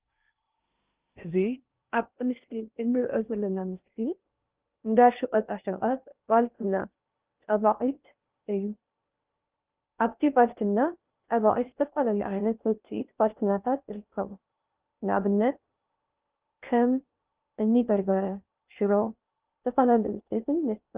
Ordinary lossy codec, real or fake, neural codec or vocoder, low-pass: Opus, 32 kbps; fake; codec, 16 kHz in and 24 kHz out, 0.6 kbps, FocalCodec, streaming, 2048 codes; 3.6 kHz